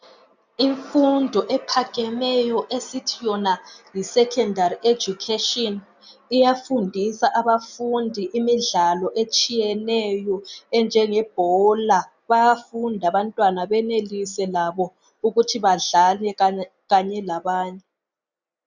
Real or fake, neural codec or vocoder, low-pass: real; none; 7.2 kHz